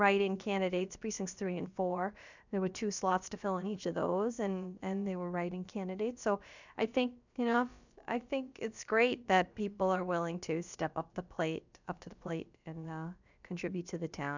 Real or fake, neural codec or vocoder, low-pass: fake; codec, 16 kHz, about 1 kbps, DyCAST, with the encoder's durations; 7.2 kHz